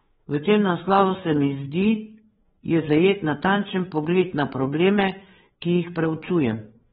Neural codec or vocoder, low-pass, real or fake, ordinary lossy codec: codec, 16 kHz, 4 kbps, FreqCodec, larger model; 7.2 kHz; fake; AAC, 16 kbps